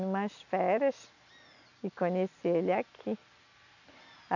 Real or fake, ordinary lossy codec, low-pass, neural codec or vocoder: real; none; 7.2 kHz; none